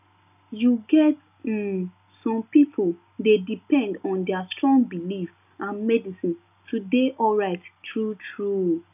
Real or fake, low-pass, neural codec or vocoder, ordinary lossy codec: real; 3.6 kHz; none; none